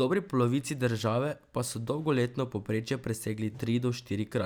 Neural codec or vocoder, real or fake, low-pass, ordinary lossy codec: none; real; none; none